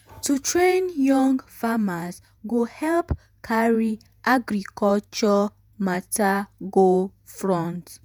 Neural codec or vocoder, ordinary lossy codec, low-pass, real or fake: vocoder, 48 kHz, 128 mel bands, Vocos; none; none; fake